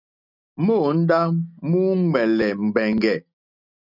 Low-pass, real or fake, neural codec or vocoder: 5.4 kHz; real; none